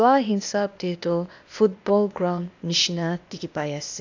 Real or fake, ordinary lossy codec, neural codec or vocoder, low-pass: fake; AAC, 48 kbps; codec, 16 kHz, 0.8 kbps, ZipCodec; 7.2 kHz